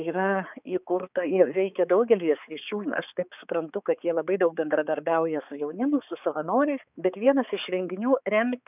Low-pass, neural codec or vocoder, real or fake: 3.6 kHz; codec, 16 kHz, 4 kbps, X-Codec, HuBERT features, trained on balanced general audio; fake